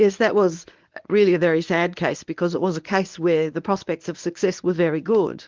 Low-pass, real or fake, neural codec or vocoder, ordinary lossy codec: 7.2 kHz; fake; codec, 24 kHz, 0.9 kbps, WavTokenizer, medium speech release version 1; Opus, 32 kbps